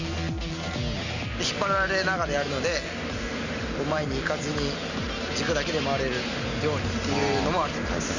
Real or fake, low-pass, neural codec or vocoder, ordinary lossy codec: real; 7.2 kHz; none; none